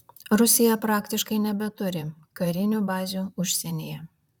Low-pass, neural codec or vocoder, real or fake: 19.8 kHz; vocoder, 44.1 kHz, 128 mel bands, Pupu-Vocoder; fake